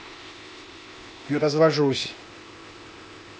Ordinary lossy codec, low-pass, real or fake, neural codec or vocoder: none; none; fake; codec, 16 kHz, 0.8 kbps, ZipCodec